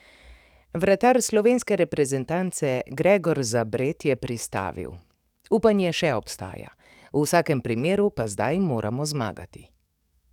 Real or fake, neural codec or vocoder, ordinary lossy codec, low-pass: fake; codec, 44.1 kHz, 7.8 kbps, DAC; none; 19.8 kHz